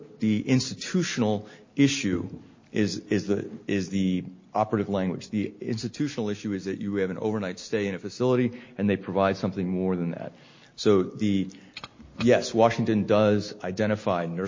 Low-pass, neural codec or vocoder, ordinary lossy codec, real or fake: 7.2 kHz; none; MP3, 32 kbps; real